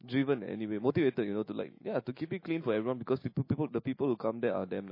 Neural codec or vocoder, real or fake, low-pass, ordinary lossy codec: autoencoder, 48 kHz, 128 numbers a frame, DAC-VAE, trained on Japanese speech; fake; 5.4 kHz; MP3, 24 kbps